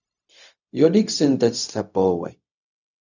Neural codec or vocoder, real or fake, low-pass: codec, 16 kHz, 0.4 kbps, LongCat-Audio-Codec; fake; 7.2 kHz